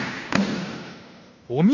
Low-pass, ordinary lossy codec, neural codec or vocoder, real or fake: 7.2 kHz; none; codec, 16 kHz in and 24 kHz out, 0.9 kbps, LongCat-Audio-Codec, fine tuned four codebook decoder; fake